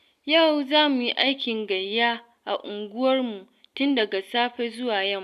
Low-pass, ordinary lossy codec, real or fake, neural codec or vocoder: 14.4 kHz; none; real; none